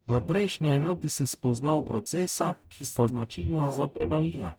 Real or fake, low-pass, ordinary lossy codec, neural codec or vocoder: fake; none; none; codec, 44.1 kHz, 0.9 kbps, DAC